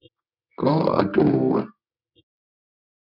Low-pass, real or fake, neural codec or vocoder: 5.4 kHz; fake; codec, 24 kHz, 0.9 kbps, WavTokenizer, medium music audio release